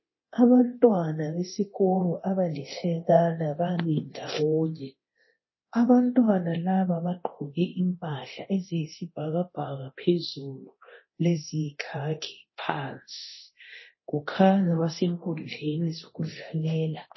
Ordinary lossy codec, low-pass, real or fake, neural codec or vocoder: MP3, 24 kbps; 7.2 kHz; fake; codec, 24 kHz, 0.9 kbps, DualCodec